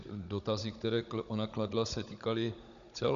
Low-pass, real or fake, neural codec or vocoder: 7.2 kHz; fake; codec, 16 kHz, 16 kbps, FunCodec, trained on Chinese and English, 50 frames a second